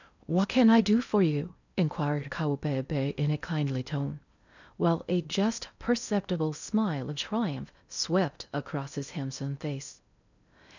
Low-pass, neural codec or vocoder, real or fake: 7.2 kHz; codec, 16 kHz in and 24 kHz out, 0.6 kbps, FocalCodec, streaming, 2048 codes; fake